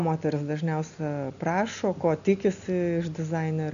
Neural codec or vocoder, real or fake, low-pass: none; real; 7.2 kHz